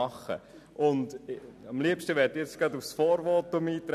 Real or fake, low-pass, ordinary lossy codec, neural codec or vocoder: real; 14.4 kHz; none; none